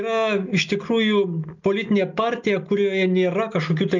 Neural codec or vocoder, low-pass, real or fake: none; 7.2 kHz; real